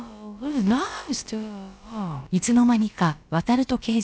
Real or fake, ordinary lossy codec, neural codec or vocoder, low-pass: fake; none; codec, 16 kHz, about 1 kbps, DyCAST, with the encoder's durations; none